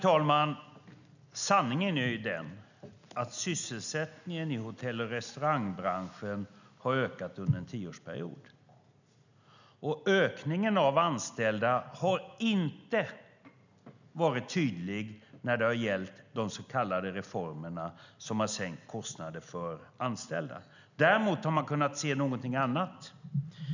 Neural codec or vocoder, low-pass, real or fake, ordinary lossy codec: none; 7.2 kHz; real; none